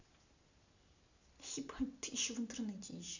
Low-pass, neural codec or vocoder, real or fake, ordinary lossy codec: 7.2 kHz; none; real; none